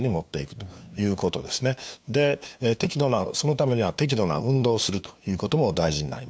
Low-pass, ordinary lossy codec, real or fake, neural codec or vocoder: none; none; fake; codec, 16 kHz, 2 kbps, FunCodec, trained on LibriTTS, 25 frames a second